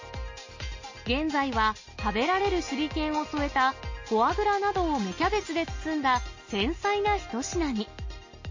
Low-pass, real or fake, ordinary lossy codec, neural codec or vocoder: 7.2 kHz; real; MP3, 32 kbps; none